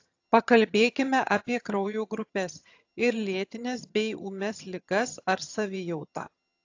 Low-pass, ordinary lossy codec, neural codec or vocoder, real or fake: 7.2 kHz; AAC, 48 kbps; vocoder, 22.05 kHz, 80 mel bands, HiFi-GAN; fake